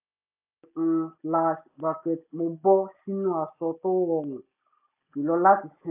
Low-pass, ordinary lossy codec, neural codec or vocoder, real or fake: 3.6 kHz; AAC, 32 kbps; none; real